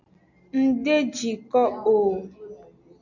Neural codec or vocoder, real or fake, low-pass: none; real; 7.2 kHz